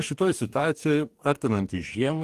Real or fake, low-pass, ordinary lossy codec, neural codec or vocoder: fake; 14.4 kHz; Opus, 24 kbps; codec, 44.1 kHz, 2.6 kbps, DAC